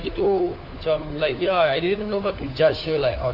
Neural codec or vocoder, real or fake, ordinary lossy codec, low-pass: codec, 16 kHz, 4 kbps, FunCodec, trained on LibriTTS, 50 frames a second; fake; AAC, 32 kbps; 5.4 kHz